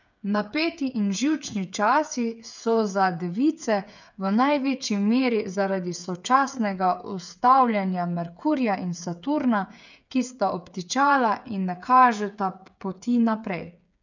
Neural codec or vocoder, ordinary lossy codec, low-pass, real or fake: codec, 16 kHz, 8 kbps, FreqCodec, smaller model; none; 7.2 kHz; fake